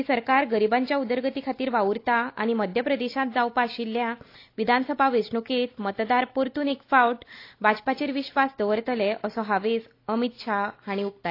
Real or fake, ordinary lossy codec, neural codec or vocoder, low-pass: real; AAC, 32 kbps; none; 5.4 kHz